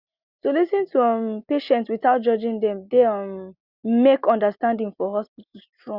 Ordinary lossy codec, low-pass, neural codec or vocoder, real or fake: Opus, 64 kbps; 5.4 kHz; none; real